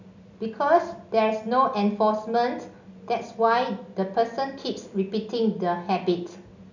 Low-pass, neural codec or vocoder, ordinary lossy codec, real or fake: 7.2 kHz; none; none; real